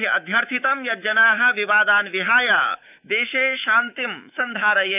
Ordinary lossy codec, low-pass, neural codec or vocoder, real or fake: none; 3.6 kHz; autoencoder, 48 kHz, 128 numbers a frame, DAC-VAE, trained on Japanese speech; fake